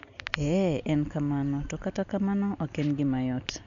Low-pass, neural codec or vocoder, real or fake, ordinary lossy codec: 7.2 kHz; none; real; none